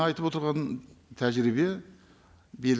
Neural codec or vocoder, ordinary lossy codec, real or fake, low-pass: none; none; real; none